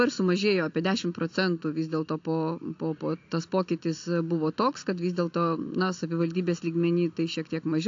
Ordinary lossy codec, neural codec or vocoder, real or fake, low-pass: AAC, 48 kbps; none; real; 7.2 kHz